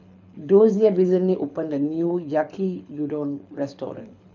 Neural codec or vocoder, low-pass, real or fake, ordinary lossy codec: codec, 24 kHz, 6 kbps, HILCodec; 7.2 kHz; fake; none